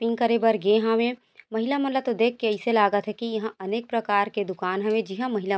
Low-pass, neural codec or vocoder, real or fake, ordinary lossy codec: none; none; real; none